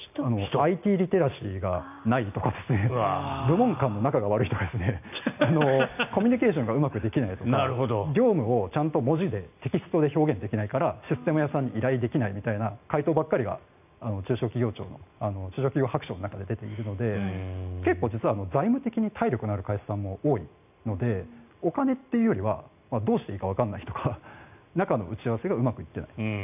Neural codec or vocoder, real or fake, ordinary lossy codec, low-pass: none; real; none; 3.6 kHz